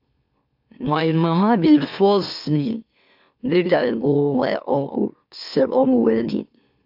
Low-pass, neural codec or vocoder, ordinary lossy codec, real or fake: 5.4 kHz; autoencoder, 44.1 kHz, a latent of 192 numbers a frame, MeloTTS; AAC, 48 kbps; fake